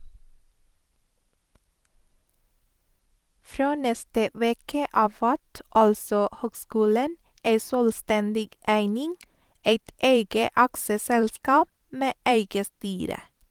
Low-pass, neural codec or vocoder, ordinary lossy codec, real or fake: 19.8 kHz; vocoder, 44.1 kHz, 128 mel bands every 512 samples, BigVGAN v2; Opus, 24 kbps; fake